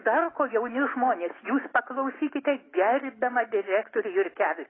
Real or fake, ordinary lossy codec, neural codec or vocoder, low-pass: fake; AAC, 16 kbps; autoencoder, 48 kHz, 128 numbers a frame, DAC-VAE, trained on Japanese speech; 7.2 kHz